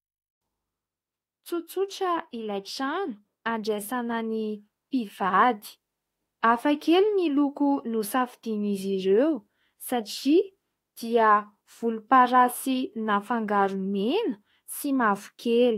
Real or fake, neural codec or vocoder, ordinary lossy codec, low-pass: fake; autoencoder, 48 kHz, 32 numbers a frame, DAC-VAE, trained on Japanese speech; AAC, 48 kbps; 19.8 kHz